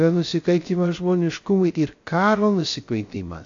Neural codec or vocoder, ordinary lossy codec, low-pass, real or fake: codec, 16 kHz, 0.3 kbps, FocalCodec; AAC, 48 kbps; 7.2 kHz; fake